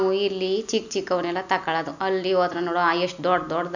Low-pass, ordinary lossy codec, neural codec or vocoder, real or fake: 7.2 kHz; none; none; real